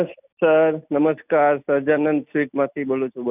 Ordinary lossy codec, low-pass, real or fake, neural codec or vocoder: none; 3.6 kHz; real; none